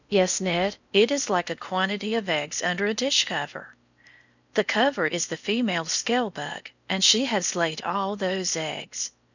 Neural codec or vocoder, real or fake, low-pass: codec, 16 kHz in and 24 kHz out, 0.6 kbps, FocalCodec, streaming, 4096 codes; fake; 7.2 kHz